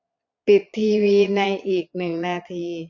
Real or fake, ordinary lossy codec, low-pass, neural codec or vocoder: fake; none; 7.2 kHz; vocoder, 22.05 kHz, 80 mel bands, Vocos